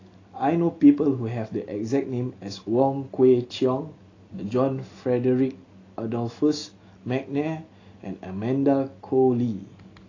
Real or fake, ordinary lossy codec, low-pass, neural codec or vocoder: real; AAC, 32 kbps; 7.2 kHz; none